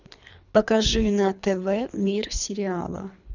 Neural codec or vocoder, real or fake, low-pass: codec, 24 kHz, 3 kbps, HILCodec; fake; 7.2 kHz